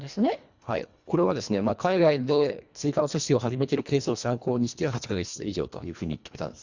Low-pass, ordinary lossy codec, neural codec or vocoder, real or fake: 7.2 kHz; Opus, 64 kbps; codec, 24 kHz, 1.5 kbps, HILCodec; fake